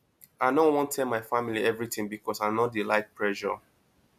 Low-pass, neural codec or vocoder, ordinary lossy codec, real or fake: 14.4 kHz; none; none; real